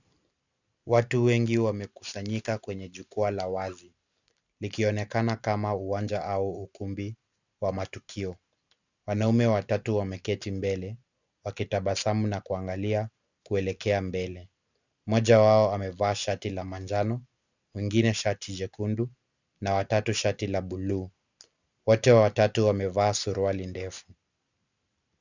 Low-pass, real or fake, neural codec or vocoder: 7.2 kHz; real; none